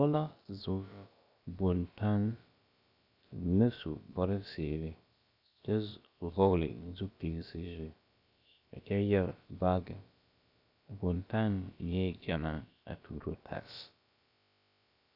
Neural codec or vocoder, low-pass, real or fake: codec, 16 kHz, about 1 kbps, DyCAST, with the encoder's durations; 5.4 kHz; fake